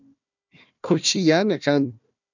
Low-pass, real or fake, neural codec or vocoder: 7.2 kHz; fake; codec, 16 kHz, 1 kbps, FunCodec, trained on Chinese and English, 50 frames a second